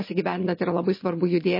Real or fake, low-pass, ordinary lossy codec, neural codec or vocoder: real; 5.4 kHz; MP3, 24 kbps; none